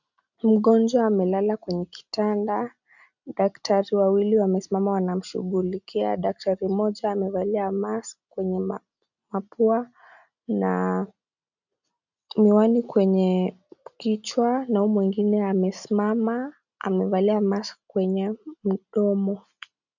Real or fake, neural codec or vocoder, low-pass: real; none; 7.2 kHz